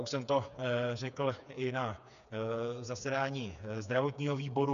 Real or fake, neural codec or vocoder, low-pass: fake; codec, 16 kHz, 4 kbps, FreqCodec, smaller model; 7.2 kHz